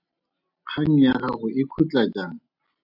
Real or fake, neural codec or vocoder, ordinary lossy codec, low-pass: real; none; AAC, 48 kbps; 5.4 kHz